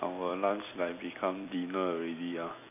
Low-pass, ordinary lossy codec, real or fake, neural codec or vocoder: 3.6 kHz; none; fake; vocoder, 44.1 kHz, 128 mel bands every 256 samples, BigVGAN v2